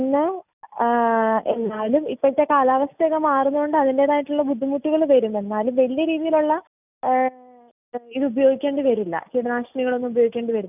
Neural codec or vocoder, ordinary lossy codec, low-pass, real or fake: none; none; 3.6 kHz; real